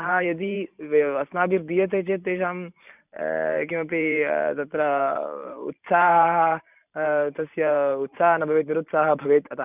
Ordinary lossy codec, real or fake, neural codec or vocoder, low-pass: none; fake; vocoder, 44.1 kHz, 128 mel bands every 512 samples, BigVGAN v2; 3.6 kHz